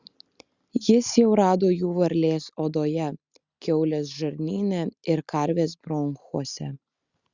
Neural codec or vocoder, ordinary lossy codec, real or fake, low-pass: none; Opus, 64 kbps; real; 7.2 kHz